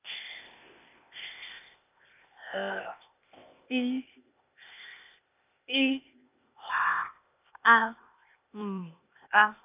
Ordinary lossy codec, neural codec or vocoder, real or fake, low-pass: none; codec, 16 kHz, 0.8 kbps, ZipCodec; fake; 3.6 kHz